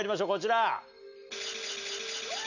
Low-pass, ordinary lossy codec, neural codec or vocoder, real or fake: 7.2 kHz; none; none; real